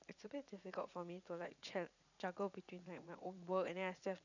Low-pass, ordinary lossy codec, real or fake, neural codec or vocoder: 7.2 kHz; none; fake; vocoder, 44.1 kHz, 80 mel bands, Vocos